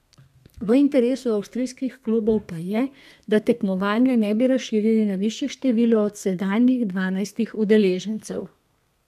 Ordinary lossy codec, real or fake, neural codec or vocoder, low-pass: none; fake; codec, 32 kHz, 1.9 kbps, SNAC; 14.4 kHz